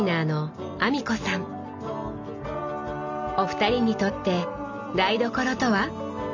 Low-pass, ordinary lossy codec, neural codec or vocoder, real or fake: 7.2 kHz; none; none; real